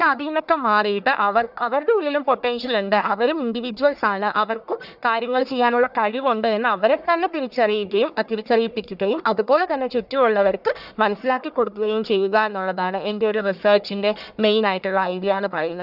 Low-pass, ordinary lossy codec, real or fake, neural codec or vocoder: 5.4 kHz; none; fake; codec, 44.1 kHz, 1.7 kbps, Pupu-Codec